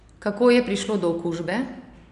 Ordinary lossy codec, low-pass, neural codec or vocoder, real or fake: none; 10.8 kHz; none; real